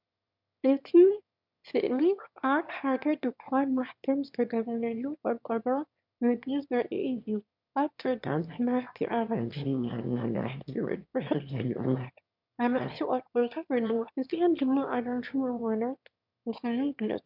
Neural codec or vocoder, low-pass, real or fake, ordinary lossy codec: autoencoder, 22.05 kHz, a latent of 192 numbers a frame, VITS, trained on one speaker; 5.4 kHz; fake; MP3, 48 kbps